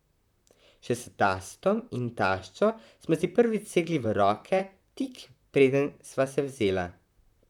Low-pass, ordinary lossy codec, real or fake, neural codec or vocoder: 19.8 kHz; none; fake; vocoder, 44.1 kHz, 128 mel bands, Pupu-Vocoder